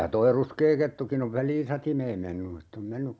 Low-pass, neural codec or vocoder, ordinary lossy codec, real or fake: none; none; none; real